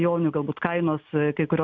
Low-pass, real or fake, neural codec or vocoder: 7.2 kHz; real; none